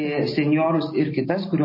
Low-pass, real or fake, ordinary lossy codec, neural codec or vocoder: 5.4 kHz; real; MP3, 24 kbps; none